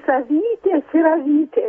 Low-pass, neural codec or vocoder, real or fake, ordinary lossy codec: 9.9 kHz; none; real; AAC, 24 kbps